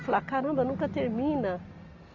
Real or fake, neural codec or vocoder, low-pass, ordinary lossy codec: real; none; 7.2 kHz; none